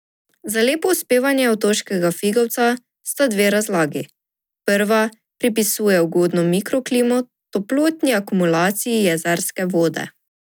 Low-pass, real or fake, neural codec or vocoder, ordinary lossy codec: none; real; none; none